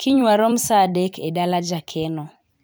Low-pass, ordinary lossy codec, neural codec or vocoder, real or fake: none; none; vocoder, 44.1 kHz, 128 mel bands every 256 samples, BigVGAN v2; fake